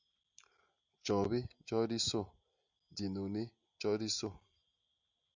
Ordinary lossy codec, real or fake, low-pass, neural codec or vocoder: Opus, 64 kbps; real; 7.2 kHz; none